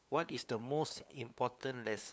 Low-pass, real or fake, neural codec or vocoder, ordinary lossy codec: none; fake; codec, 16 kHz, 2 kbps, FunCodec, trained on LibriTTS, 25 frames a second; none